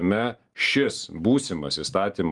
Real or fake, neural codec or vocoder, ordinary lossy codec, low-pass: fake; vocoder, 44.1 kHz, 128 mel bands every 512 samples, BigVGAN v2; Opus, 32 kbps; 10.8 kHz